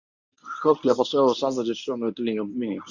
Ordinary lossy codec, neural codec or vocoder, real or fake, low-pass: none; codec, 24 kHz, 0.9 kbps, WavTokenizer, medium speech release version 1; fake; 7.2 kHz